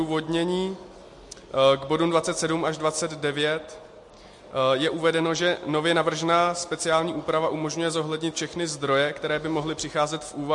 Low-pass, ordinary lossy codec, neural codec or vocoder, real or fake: 10.8 kHz; MP3, 48 kbps; none; real